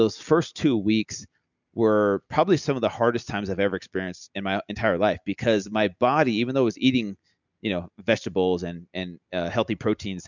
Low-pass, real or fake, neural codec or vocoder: 7.2 kHz; real; none